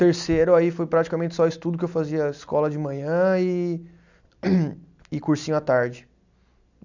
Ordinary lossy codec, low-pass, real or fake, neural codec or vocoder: none; 7.2 kHz; real; none